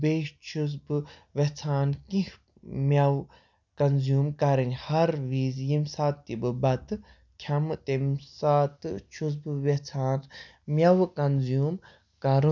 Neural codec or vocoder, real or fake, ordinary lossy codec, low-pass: none; real; none; 7.2 kHz